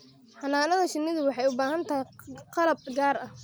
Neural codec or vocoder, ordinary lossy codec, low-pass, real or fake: none; none; none; real